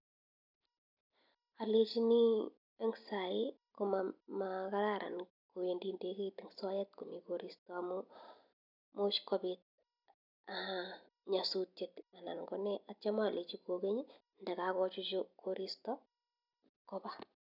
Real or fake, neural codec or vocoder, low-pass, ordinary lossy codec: real; none; 5.4 kHz; none